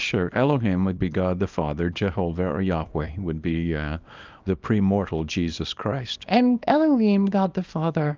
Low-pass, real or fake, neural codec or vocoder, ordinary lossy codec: 7.2 kHz; fake; codec, 24 kHz, 0.9 kbps, WavTokenizer, small release; Opus, 24 kbps